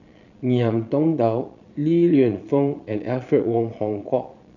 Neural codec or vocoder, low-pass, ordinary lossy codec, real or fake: vocoder, 22.05 kHz, 80 mel bands, Vocos; 7.2 kHz; none; fake